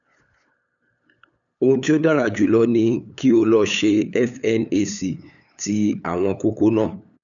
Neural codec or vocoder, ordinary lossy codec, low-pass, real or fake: codec, 16 kHz, 8 kbps, FunCodec, trained on LibriTTS, 25 frames a second; none; 7.2 kHz; fake